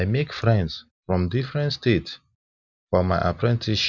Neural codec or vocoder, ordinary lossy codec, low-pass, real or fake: none; none; 7.2 kHz; real